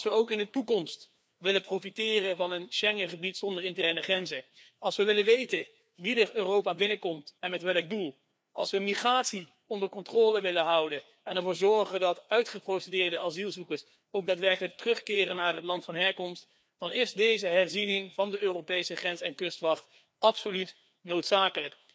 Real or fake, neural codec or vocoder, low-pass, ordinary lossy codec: fake; codec, 16 kHz, 2 kbps, FreqCodec, larger model; none; none